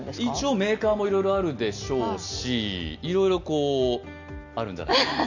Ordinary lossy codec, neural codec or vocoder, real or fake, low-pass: none; none; real; 7.2 kHz